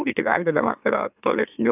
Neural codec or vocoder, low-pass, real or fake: autoencoder, 44.1 kHz, a latent of 192 numbers a frame, MeloTTS; 3.6 kHz; fake